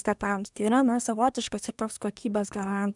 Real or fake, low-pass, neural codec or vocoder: fake; 10.8 kHz; codec, 24 kHz, 1 kbps, SNAC